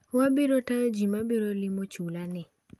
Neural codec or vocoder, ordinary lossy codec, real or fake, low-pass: none; Opus, 32 kbps; real; 14.4 kHz